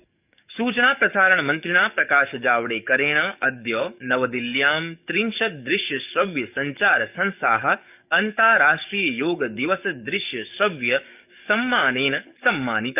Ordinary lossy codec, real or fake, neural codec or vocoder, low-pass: none; fake; codec, 44.1 kHz, 7.8 kbps, DAC; 3.6 kHz